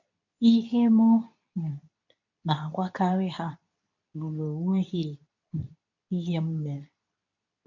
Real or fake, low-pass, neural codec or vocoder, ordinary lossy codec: fake; 7.2 kHz; codec, 24 kHz, 0.9 kbps, WavTokenizer, medium speech release version 2; none